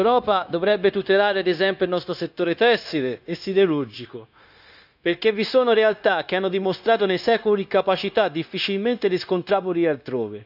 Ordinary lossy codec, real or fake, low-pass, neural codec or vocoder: none; fake; 5.4 kHz; codec, 16 kHz, 0.9 kbps, LongCat-Audio-Codec